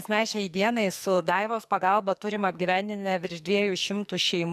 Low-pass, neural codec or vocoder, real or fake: 14.4 kHz; codec, 44.1 kHz, 2.6 kbps, SNAC; fake